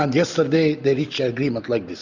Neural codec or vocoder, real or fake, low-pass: none; real; 7.2 kHz